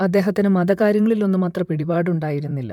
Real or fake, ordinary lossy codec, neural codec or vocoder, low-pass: fake; MP3, 96 kbps; vocoder, 44.1 kHz, 128 mel bands, Pupu-Vocoder; 19.8 kHz